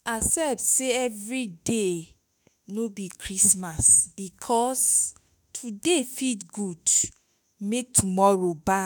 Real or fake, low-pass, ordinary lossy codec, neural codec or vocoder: fake; none; none; autoencoder, 48 kHz, 32 numbers a frame, DAC-VAE, trained on Japanese speech